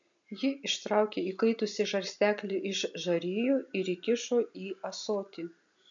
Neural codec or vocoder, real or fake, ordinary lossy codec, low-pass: none; real; MP3, 64 kbps; 7.2 kHz